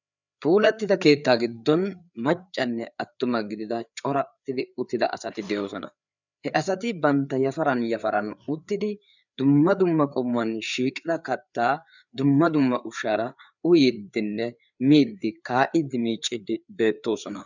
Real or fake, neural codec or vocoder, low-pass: fake; codec, 16 kHz, 4 kbps, FreqCodec, larger model; 7.2 kHz